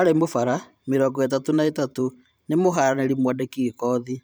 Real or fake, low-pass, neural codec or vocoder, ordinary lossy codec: fake; none; vocoder, 44.1 kHz, 128 mel bands every 512 samples, BigVGAN v2; none